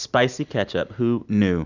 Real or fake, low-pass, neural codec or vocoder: real; 7.2 kHz; none